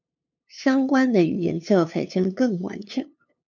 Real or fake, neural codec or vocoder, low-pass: fake; codec, 16 kHz, 2 kbps, FunCodec, trained on LibriTTS, 25 frames a second; 7.2 kHz